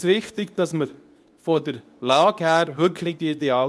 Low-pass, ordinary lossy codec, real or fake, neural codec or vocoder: none; none; fake; codec, 24 kHz, 0.9 kbps, WavTokenizer, medium speech release version 2